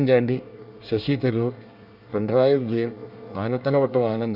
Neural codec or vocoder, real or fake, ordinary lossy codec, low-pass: codec, 24 kHz, 1 kbps, SNAC; fake; none; 5.4 kHz